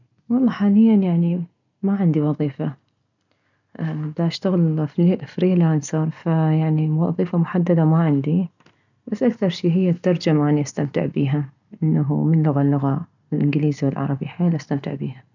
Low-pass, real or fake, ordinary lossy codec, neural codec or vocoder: 7.2 kHz; real; none; none